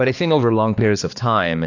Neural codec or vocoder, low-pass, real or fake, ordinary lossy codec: codec, 16 kHz, 2 kbps, X-Codec, HuBERT features, trained on balanced general audio; 7.2 kHz; fake; AAC, 48 kbps